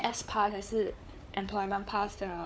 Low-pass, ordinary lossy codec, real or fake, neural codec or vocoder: none; none; fake; codec, 16 kHz, 4 kbps, FunCodec, trained on Chinese and English, 50 frames a second